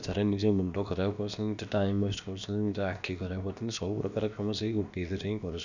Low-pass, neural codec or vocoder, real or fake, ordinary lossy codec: 7.2 kHz; codec, 16 kHz, about 1 kbps, DyCAST, with the encoder's durations; fake; none